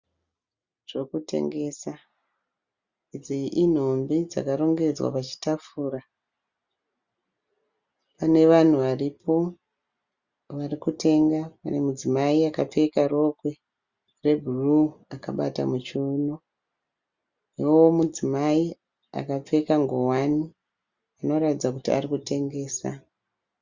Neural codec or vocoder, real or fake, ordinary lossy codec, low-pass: none; real; Opus, 64 kbps; 7.2 kHz